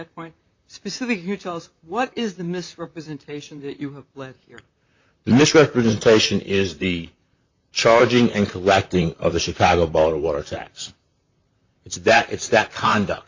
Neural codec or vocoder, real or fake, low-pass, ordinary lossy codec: vocoder, 44.1 kHz, 128 mel bands, Pupu-Vocoder; fake; 7.2 kHz; MP3, 64 kbps